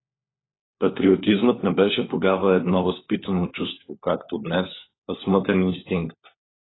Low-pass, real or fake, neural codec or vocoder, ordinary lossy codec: 7.2 kHz; fake; codec, 16 kHz, 4 kbps, FunCodec, trained on LibriTTS, 50 frames a second; AAC, 16 kbps